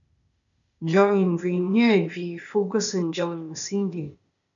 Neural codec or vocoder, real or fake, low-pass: codec, 16 kHz, 0.8 kbps, ZipCodec; fake; 7.2 kHz